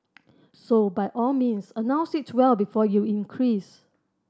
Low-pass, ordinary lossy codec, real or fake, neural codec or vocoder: none; none; real; none